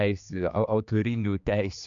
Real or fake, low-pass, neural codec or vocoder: fake; 7.2 kHz; codec, 16 kHz, 2 kbps, X-Codec, HuBERT features, trained on general audio